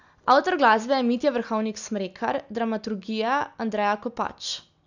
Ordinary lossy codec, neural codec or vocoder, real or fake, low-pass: none; none; real; 7.2 kHz